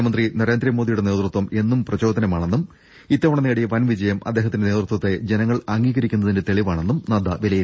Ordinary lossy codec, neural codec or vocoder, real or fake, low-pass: none; none; real; none